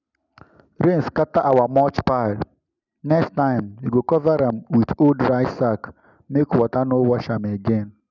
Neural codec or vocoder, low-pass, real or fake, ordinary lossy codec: none; 7.2 kHz; real; none